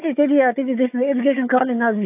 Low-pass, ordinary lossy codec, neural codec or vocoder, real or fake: 3.6 kHz; AAC, 24 kbps; codec, 16 kHz, 4 kbps, FunCodec, trained on Chinese and English, 50 frames a second; fake